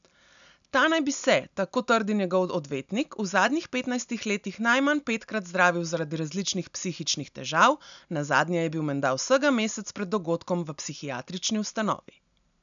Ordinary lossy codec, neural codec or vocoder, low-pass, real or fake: none; none; 7.2 kHz; real